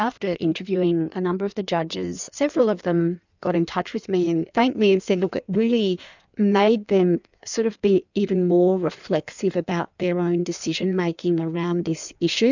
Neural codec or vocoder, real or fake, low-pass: codec, 16 kHz in and 24 kHz out, 1.1 kbps, FireRedTTS-2 codec; fake; 7.2 kHz